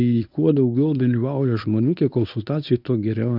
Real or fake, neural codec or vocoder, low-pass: fake; codec, 24 kHz, 0.9 kbps, WavTokenizer, medium speech release version 1; 5.4 kHz